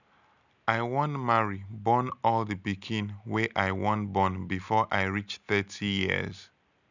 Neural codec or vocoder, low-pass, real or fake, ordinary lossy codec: none; 7.2 kHz; real; none